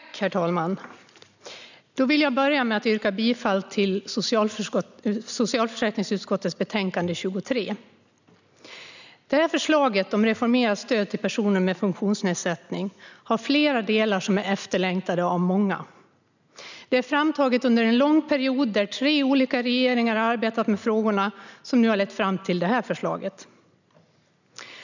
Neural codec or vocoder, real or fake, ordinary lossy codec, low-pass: none; real; none; 7.2 kHz